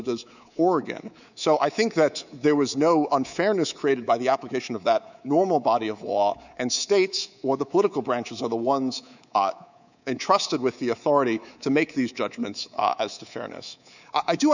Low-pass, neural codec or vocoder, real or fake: 7.2 kHz; codec, 24 kHz, 3.1 kbps, DualCodec; fake